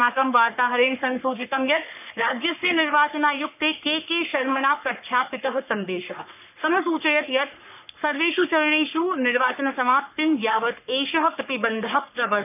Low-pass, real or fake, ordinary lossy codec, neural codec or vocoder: 3.6 kHz; fake; none; codec, 44.1 kHz, 3.4 kbps, Pupu-Codec